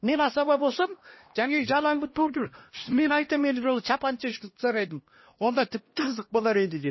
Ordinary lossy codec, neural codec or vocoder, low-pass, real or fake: MP3, 24 kbps; codec, 16 kHz, 1 kbps, X-Codec, HuBERT features, trained on LibriSpeech; 7.2 kHz; fake